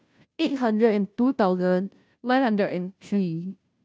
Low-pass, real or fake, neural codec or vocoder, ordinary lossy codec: none; fake; codec, 16 kHz, 0.5 kbps, FunCodec, trained on Chinese and English, 25 frames a second; none